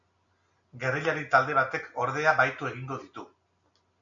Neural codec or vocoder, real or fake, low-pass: none; real; 7.2 kHz